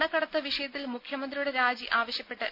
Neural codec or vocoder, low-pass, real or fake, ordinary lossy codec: none; 5.4 kHz; real; none